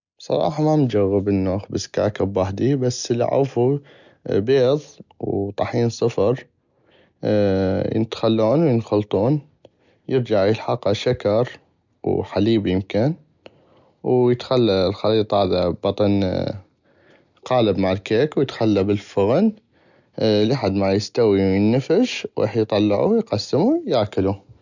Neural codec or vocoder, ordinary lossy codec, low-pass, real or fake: none; none; 7.2 kHz; real